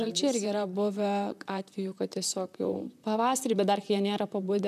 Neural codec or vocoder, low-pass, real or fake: vocoder, 44.1 kHz, 128 mel bands, Pupu-Vocoder; 14.4 kHz; fake